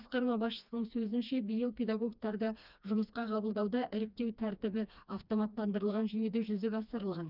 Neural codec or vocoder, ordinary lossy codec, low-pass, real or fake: codec, 16 kHz, 2 kbps, FreqCodec, smaller model; none; 5.4 kHz; fake